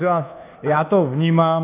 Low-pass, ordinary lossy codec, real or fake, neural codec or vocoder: 3.6 kHz; AAC, 24 kbps; fake; codec, 24 kHz, 1.2 kbps, DualCodec